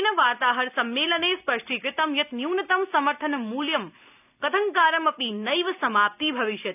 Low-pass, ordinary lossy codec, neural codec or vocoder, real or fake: 3.6 kHz; none; none; real